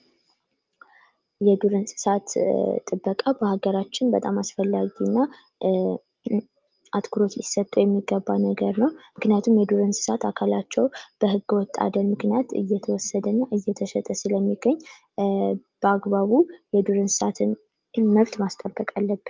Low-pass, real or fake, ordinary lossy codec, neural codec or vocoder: 7.2 kHz; real; Opus, 24 kbps; none